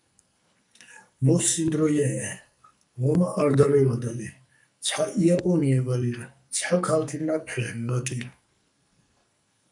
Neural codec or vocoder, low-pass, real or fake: codec, 44.1 kHz, 2.6 kbps, SNAC; 10.8 kHz; fake